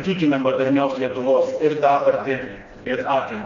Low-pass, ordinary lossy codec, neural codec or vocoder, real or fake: 7.2 kHz; MP3, 64 kbps; codec, 16 kHz, 1 kbps, FreqCodec, smaller model; fake